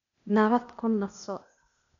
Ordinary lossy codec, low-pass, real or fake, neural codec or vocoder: none; 7.2 kHz; fake; codec, 16 kHz, 0.8 kbps, ZipCodec